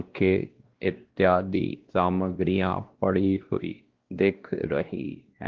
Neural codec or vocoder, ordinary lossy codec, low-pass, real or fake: codec, 16 kHz, 1 kbps, X-Codec, WavLM features, trained on Multilingual LibriSpeech; Opus, 16 kbps; 7.2 kHz; fake